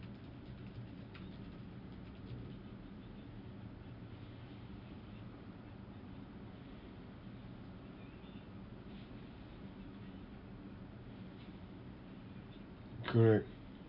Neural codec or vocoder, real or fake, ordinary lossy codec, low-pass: none; real; none; 5.4 kHz